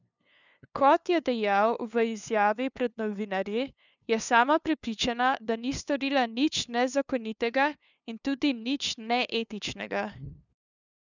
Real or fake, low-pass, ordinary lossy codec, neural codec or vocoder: fake; 7.2 kHz; none; codec, 16 kHz, 2 kbps, FunCodec, trained on LibriTTS, 25 frames a second